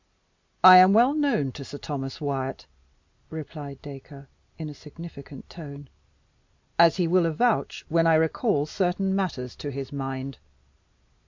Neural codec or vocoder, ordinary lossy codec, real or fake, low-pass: none; MP3, 64 kbps; real; 7.2 kHz